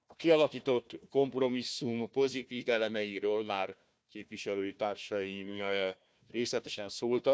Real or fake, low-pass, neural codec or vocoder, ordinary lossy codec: fake; none; codec, 16 kHz, 1 kbps, FunCodec, trained on Chinese and English, 50 frames a second; none